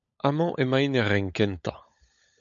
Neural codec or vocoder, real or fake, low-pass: codec, 16 kHz, 16 kbps, FunCodec, trained on LibriTTS, 50 frames a second; fake; 7.2 kHz